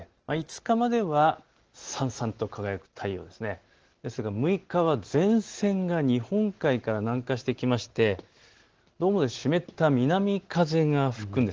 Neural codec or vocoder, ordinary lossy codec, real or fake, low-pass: none; Opus, 16 kbps; real; 7.2 kHz